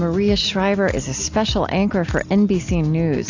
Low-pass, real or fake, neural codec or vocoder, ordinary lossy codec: 7.2 kHz; real; none; AAC, 48 kbps